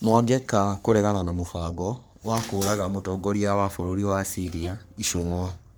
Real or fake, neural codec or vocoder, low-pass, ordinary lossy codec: fake; codec, 44.1 kHz, 3.4 kbps, Pupu-Codec; none; none